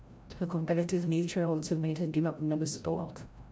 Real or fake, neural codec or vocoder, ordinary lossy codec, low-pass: fake; codec, 16 kHz, 0.5 kbps, FreqCodec, larger model; none; none